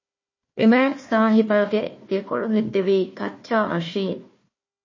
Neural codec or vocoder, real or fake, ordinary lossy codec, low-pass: codec, 16 kHz, 1 kbps, FunCodec, trained on Chinese and English, 50 frames a second; fake; MP3, 32 kbps; 7.2 kHz